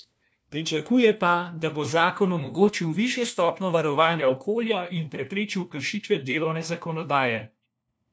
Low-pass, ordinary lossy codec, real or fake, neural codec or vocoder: none; none; fake; codec, 16 kHz, 1 kbps, FunCodec, trained on LibriTTS, 50 frames a second